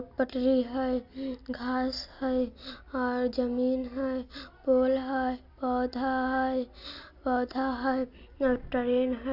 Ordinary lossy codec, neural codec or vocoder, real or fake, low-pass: none; none; real; 5.4 kHz